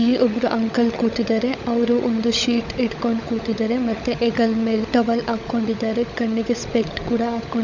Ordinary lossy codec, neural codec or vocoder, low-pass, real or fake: none; codec, 16 kHz, 16 kbps, FunCodec, trained on LibriTTS, 50 frames a second; 7.2 kHz; fake